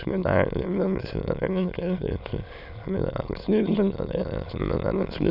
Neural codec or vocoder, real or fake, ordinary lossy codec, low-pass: autoencoder, 22.05 kHz, a latent of 192 numbers a frame, VITS, trained on many speakers; fake; none; 5.4 kHz